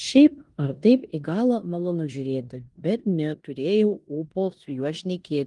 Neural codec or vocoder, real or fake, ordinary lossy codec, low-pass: codec, 16 kHz in and 24 kHz out, 0.9 kbps, LongCat-Audio-Codec, four codebook decoder; fake; Opus, 24 kbps; 10.8 kHz